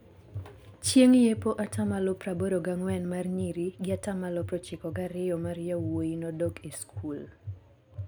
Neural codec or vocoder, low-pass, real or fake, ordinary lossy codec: none; none; real; none